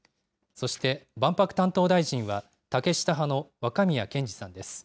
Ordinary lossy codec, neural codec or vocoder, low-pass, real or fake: none; none; none; real